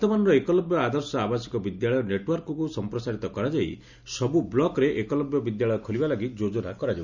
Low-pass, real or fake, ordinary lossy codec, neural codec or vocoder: 7.2 kHz; real; none; none